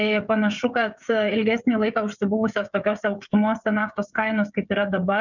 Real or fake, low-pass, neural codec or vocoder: fake; 7.2 kHz; codec, 16 kHz, 16 kbps, FreqCodec, smaller model